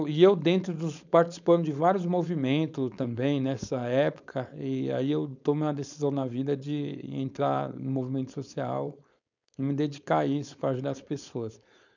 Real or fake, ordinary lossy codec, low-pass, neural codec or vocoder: fake; none; 7.2 kHz; codec, 16 kHz, 4.8 kbps, FACodec